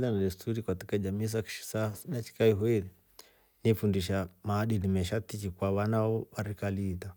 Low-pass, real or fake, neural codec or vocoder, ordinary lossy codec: none; real; none; none